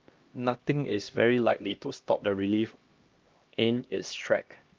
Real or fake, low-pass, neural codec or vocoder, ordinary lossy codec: fake; 7.2 kHz; codec, 16 kHz, 1 kbps, X-Codec, HuBERT features, trained on LibriSpeech; Opus, 32 kbps